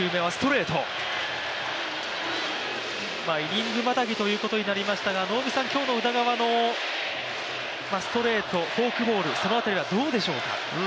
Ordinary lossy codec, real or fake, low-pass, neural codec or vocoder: none; real; none; none